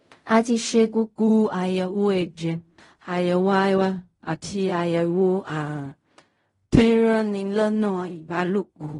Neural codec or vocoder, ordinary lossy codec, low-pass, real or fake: codec, 16 kHz in and 24 kHz out, 0.4 kbps, LongCat-Audio-Codec, fine tuned four codebook decoder; AAC, 32 kbps; 10.8 kHz; fake